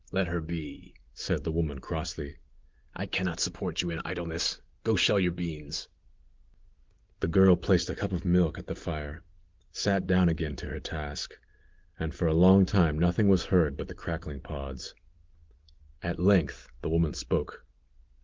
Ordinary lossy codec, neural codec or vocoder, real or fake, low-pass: Opus, 16 kbps; none; real; 7.2 kHz